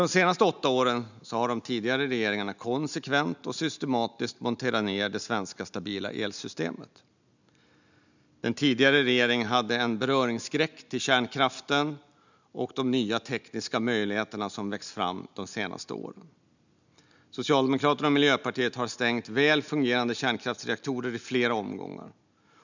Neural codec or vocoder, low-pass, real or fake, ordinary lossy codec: none; 7.2 kHz; real; none